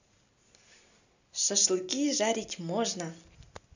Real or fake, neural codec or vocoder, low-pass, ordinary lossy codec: real; none; 7.2 kHz; none